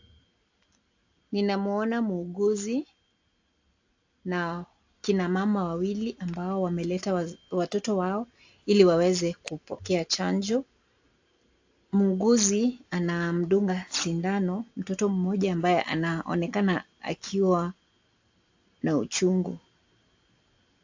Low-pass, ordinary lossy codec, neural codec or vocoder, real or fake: 7.2 kHz; AAC, 48 kbps; none; real